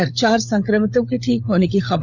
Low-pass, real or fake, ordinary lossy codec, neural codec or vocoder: 7.2 kHz; fake; none; codec, 16 kHz, 16 kbps, FunCodec, trained on LibriTTS, 50 frames a second